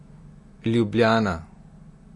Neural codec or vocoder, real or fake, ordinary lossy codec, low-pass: none; real; MP3, 48 kbps; 10.8 kHz